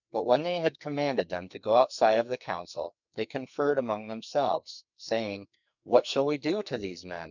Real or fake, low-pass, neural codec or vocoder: fake; 7.2 kHz; codec, 44.1 kHz, 2.6 kbps, SNAC